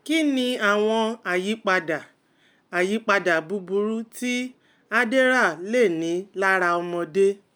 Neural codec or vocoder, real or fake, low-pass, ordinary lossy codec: none; real; none; none